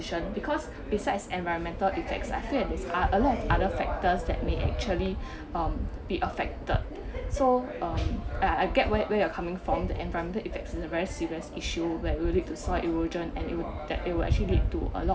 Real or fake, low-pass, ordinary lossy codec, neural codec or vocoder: real; none; none; none